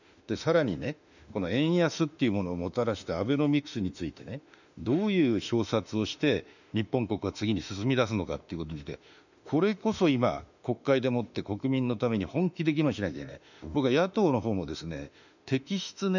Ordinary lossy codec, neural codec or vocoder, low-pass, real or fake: none; autoencoder, 48 kHz, 32 numbers a frame, DAC-VAE, trained on Japanese speech; 7.2 kHz; fake